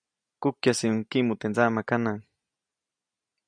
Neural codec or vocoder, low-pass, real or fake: none; 9.9 kHz; real